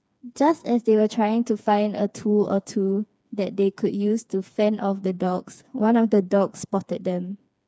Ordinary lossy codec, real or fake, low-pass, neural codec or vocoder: none; fake; none; codec, 16 kHz, 4 kbps, FreqCodec, smaller model